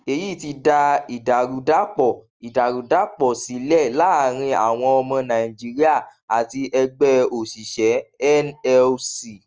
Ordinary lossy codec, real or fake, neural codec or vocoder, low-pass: Opus, 24 kbps; real; none; 7.2 kHz